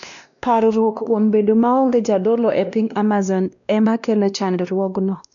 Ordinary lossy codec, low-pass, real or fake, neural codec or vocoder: none; 7.2 kHz; fake; codec, 16 kHz, 1 kbps, X-Codec, WavLM features, trained on Multilingual LibriSpeech